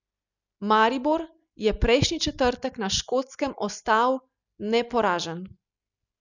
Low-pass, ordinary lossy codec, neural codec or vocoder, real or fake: 7.2 kHz; none; none; real